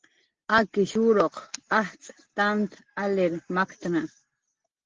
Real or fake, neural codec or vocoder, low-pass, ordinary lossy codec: real; none; 7.2 kHz; Opus, 16 kbps